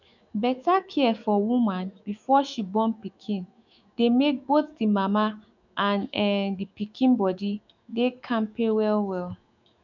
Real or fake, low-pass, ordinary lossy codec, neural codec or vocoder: fake; 7.2 kHz; none; autoencoder, 48 kHz, 128 numbers a frame, DAC-VAE, trained on Japanese speech